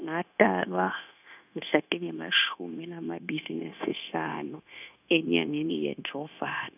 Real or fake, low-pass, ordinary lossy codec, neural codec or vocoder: fake; 3.6 kHz; none; codec, 16 kHz, 0.9 kbps, LongCat-Audio-Codec